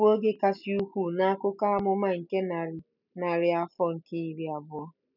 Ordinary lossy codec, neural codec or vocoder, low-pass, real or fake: none; none; 5.4 kHz; real